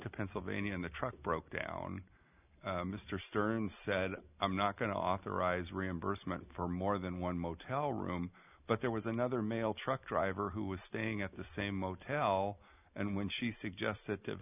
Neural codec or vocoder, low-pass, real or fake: none; 3.6 kHz; real